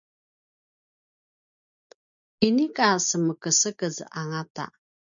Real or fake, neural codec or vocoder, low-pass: real; none; 7.2 kHz